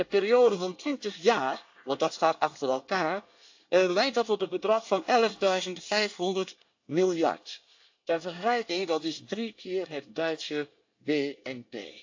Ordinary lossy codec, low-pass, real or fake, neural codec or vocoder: AAC, 48 kbps; 7.2 kHz; fake; codec, 24 kHz, 1 kbps, SNAC